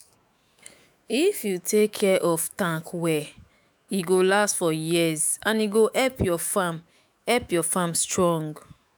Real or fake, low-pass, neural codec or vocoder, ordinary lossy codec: fake; none; autoencoder, 48 kHz, 128 numbers a frame, DAC-VAE, trained on Japanese speech; none